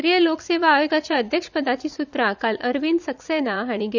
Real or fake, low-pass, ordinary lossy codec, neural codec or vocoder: fake; 7.2 kHz; none; vocoder, 44.1 kHz, 80 mel bands, Vocos